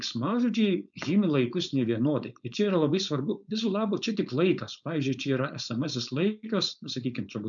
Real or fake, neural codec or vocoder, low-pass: fake; codec, 16 kHz, 4.8 kbps, FACodec; 7.2 kHz